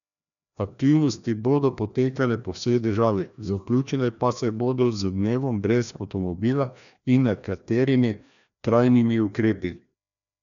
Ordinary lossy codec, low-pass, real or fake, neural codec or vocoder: none; 7.2 kHz; fake; codec, 16 kHz, 1 kbps, FreqCodec, larger model